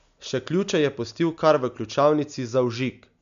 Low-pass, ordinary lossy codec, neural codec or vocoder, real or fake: 7.2 kHz; none; none; real